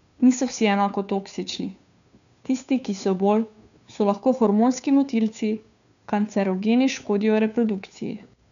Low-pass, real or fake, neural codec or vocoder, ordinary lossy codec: 7.2 kHz; fake; codec, 16 kHz, 2 kbps, FunCodec, trained on Chinese and English, 25 frames a second; none